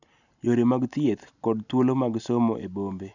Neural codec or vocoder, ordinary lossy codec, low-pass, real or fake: none; none; 7.2 kHz; real